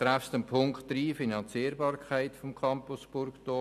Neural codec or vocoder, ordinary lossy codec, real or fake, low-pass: none; none; real; 14.4 kHz